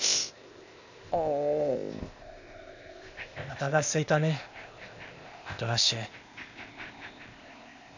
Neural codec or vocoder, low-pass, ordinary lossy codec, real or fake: codec, 16 kHz, 0.8 kbps, ZipCodec; 7.2 kHz; none; fake